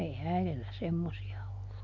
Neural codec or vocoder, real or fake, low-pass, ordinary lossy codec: none; real; 7.2 kHz; none